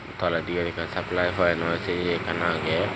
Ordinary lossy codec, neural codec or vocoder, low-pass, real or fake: none; none; none; real